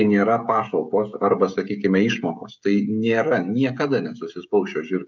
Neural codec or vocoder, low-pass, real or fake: codec, 16 kHz, 16 kbps, FreqCodec, smaller model; 7.2 kHz; fake